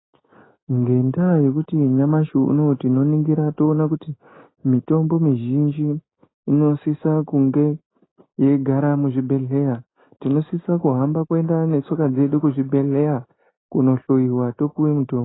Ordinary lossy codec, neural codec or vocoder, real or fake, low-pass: AAC, 16 kbps; none; real; 7.2 kHz